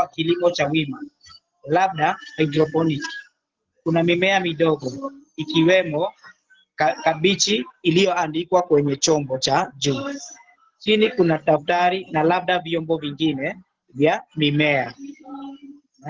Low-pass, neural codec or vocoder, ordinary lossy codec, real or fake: 7.2 kHz; none; Opus, 16 kbps; real